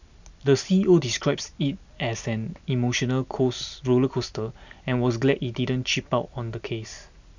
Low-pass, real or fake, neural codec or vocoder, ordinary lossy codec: 7.2 kHz; real; none; none